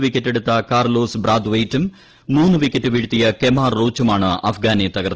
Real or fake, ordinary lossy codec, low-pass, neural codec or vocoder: real; Opus, 16 kbps; 7.2 kHz; none